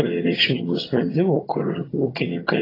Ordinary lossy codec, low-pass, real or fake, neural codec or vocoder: AAC, 24 kbps; 5.4 kHz; fake; vocoder, 22.05 kHz, 80 mel bands, HiFi-GAN